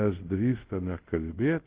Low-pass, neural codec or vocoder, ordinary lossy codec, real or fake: 3.6 kHz; codec, 24 kHz, 0.5 kbps, DualCodec; Opus, 16 kbps; fake